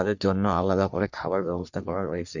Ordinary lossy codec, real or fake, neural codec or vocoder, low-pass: none; fake; codec, 44.1 kHz, 3.4 kbps, Pupu-Codec; 7.2 kHz